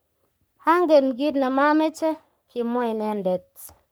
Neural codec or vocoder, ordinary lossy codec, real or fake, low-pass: codec, 44.1 kHz, 3.4 kbps, Pupu-Codec; none; fake; none